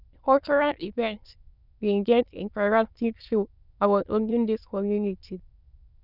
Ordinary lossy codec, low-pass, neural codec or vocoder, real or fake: none; 5.4 kHz; autoencoder, 22.05 kHz, a latent of 192 numbers a frame, VITS, trained on many speakers; fake